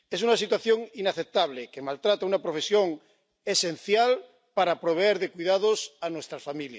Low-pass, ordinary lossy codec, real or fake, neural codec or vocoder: none; none; real; none